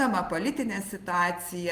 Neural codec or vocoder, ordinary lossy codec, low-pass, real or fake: none; Opus, 24 kbps; 14.4 kHz; real